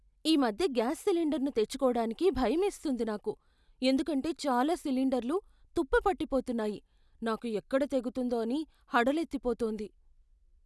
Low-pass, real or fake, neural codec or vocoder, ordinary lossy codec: none; real; none; none